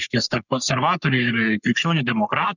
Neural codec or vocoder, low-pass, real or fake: codec, 44.1 kHz, 3.4 kbps, Pupu-Codec; 7.2 kHz; fake